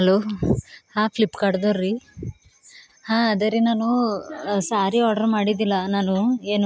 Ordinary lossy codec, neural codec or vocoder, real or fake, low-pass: none; none; real; none